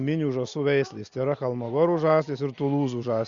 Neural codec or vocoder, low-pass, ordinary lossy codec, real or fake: none; 7.2 kHz; Opus, 64 kbps; real